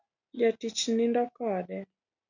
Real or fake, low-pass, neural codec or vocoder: real; 7.2 kHz; none